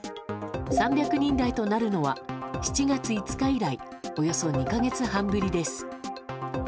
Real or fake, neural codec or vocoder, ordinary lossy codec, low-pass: real; none; none; none